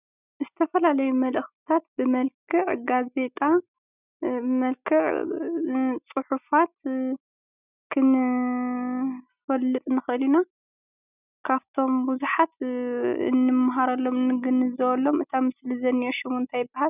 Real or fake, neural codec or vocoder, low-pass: real; none; 3.6 kHz